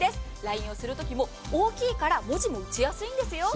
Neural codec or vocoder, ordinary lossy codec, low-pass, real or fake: none; none; none; real